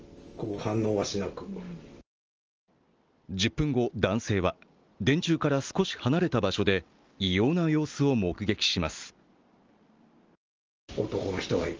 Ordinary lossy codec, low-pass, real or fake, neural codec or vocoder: Opus, 24 kbps; 7.2 kHz; real; none